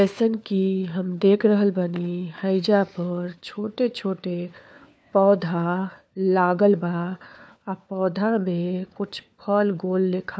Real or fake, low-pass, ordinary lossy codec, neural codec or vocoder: fake; none; none; codec, 16 kHz, 4 kbps, FunCodec, trained on LibriTTS, 50 frames a second